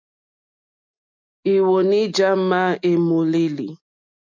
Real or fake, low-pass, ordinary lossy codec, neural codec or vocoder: real; 7.2 kHz; MP3, 64 kbps; none